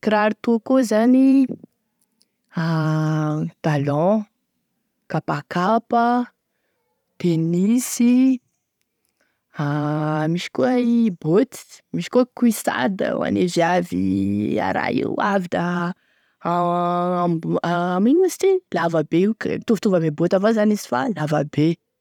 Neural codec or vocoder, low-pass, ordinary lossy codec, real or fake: vocoder, 44.1 kHz, 128 mel bands every 512 samples, BigVGAN v2; 19.8 kHz; none; fake